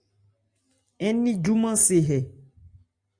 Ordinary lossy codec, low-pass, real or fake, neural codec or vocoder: Opus, 64 kbps; 9.9 kHz; real; none